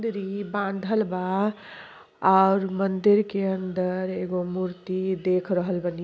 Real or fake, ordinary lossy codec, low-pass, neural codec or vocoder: real; none; none; none